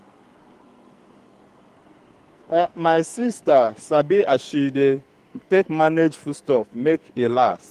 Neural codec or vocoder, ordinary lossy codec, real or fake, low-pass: codec, 44.1 kHz, 2.6 kbps, SNAC; Opus, 32 kbps; fake; 14.4 kHz